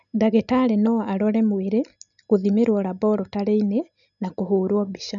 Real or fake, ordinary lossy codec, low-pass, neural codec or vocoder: real; none; 7.2 kHz; none